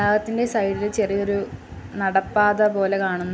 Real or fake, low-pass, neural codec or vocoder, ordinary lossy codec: real; none; none; none